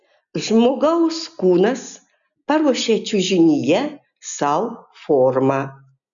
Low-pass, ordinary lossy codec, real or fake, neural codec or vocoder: 7.2 kHz; MP3, 96 kbps; real; none